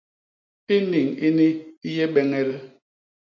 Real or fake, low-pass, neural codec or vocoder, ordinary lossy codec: real; 7.2 kHz; none; AAC, 48 kbps